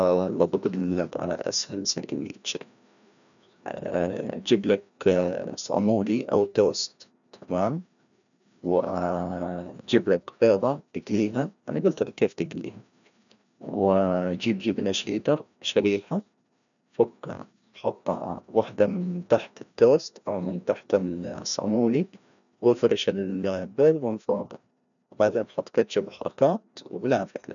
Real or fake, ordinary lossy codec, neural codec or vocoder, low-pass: fake; none; codec, 16 kHz, 1 kbps, FreqCodec, larger model; 7.2 kHz